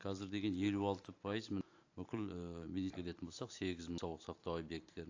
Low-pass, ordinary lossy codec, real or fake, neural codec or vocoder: 7.2 kHz; AAC, 48 kbps; fake; vocoder, 44.1 kHz, 128 mel bands every 512 samples, BigVGAN v2